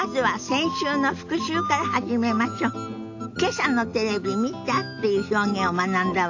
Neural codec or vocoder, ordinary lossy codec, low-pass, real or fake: none; AAC, 48 kbps; 7.2 kHz; real